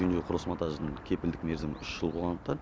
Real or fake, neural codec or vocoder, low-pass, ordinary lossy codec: real; none; none; none